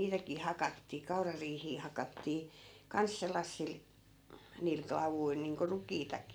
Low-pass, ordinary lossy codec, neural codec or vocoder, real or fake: none; none; none; real